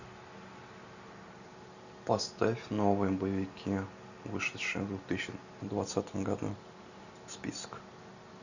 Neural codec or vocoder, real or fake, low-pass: none; real; 7.2 kHz